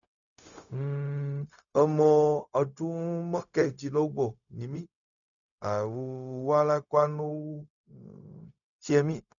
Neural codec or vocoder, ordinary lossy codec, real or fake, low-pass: codec, 16 kHz, 0.4 kbps, LongCat-Audio-Codec; none; fake; 7.2 kHz